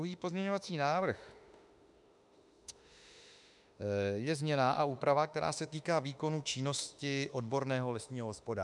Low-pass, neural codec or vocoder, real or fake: 10.8 kHz; autoencoder, 48 kHz, 32 numbers a frame, DAC-VAE, trained on Japanese speech; fake